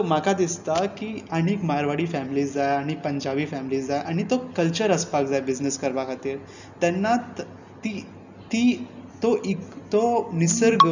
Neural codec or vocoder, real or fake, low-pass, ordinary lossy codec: none; real; 7.2 kHz; none